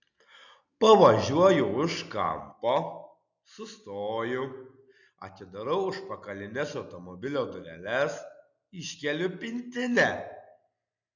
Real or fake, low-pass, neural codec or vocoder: real; 7.2 kHz; none